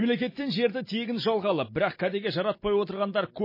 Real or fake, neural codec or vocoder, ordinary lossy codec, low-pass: real; none; MP3, 24 kbps; 5.4 kHz